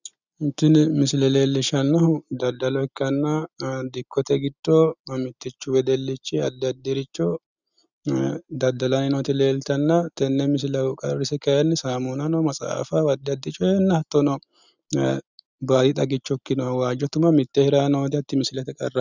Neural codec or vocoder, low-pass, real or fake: none; 7.2 kHz; real